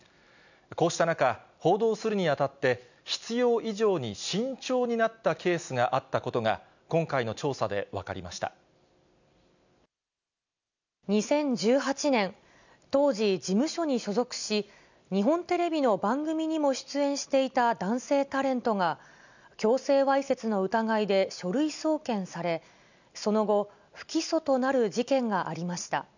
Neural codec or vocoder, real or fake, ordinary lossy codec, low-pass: none; real; none; 7.2 kHz